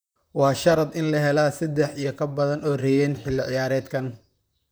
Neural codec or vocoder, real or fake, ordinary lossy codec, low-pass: vocoder, 44.1 kHz, 128 mel bands, Pupu-Vocoder; fake; none; none